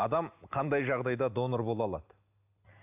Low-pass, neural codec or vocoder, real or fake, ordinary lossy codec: 3.6 kHz; none; real; AAC, 32 kbps